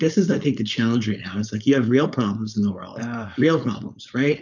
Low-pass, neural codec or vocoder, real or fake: 7.2 kHz; codec, 16 kHz, 4.8 kbps, FACodec; fake